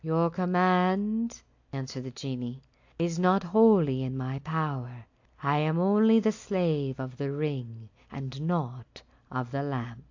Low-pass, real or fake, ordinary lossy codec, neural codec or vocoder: 7.2 kHz; real; AAC, 48 kbps; none